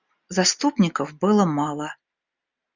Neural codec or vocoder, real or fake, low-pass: none; real; 7.2 kHz